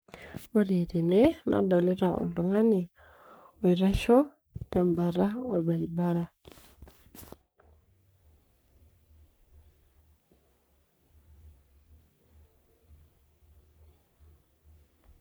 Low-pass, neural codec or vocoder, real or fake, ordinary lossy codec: none; codec, 44.1 kHz, 3.4 kbps, Pupu-Codec; fake; none